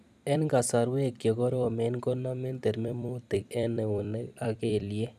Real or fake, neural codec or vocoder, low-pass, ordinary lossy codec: fake; vocoder, 44.1 kHz, 128 mel bands every 256 samples, BigVGAN v2; 14.4 kHz; none